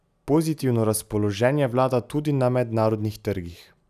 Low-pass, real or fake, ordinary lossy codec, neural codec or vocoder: 14.4 kHz; real; none; none